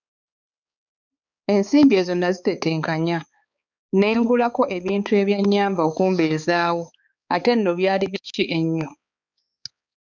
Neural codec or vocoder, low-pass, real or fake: codec, 16 kHz, 4 kbps, X-Codec, HuBERT features, trained on balanced general audio; 7.2 kHz; fake